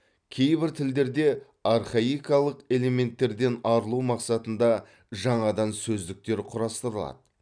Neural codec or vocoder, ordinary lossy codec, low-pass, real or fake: none; none; 9.9 kHz; real